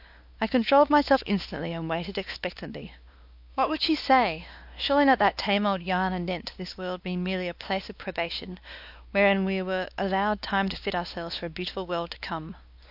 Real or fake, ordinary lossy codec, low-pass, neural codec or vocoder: fake; AAC, 48 kbps; 5.4 kHz; codec, 16 kHz, 2 kbps, X-Codec, WavLM features, trained on Multilingual LibriSpeech